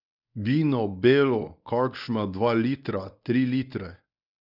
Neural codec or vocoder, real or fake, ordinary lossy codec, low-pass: codec, 24 kHz, 0.9 kbps, WavTokenizer, medium speech release version 1; fake; none; 5.4 kHz